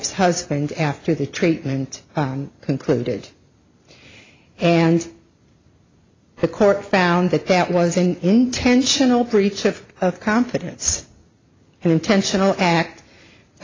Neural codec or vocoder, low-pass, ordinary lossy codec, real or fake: none; 7.2 kHz; AAC, 32 kbps; real